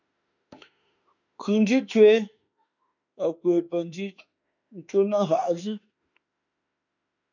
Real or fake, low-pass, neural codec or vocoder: fake; 7.2 kHz; autoencoder, 48 kHz, 32 numbers a frame, DAC-VAE, trained on Japanese speech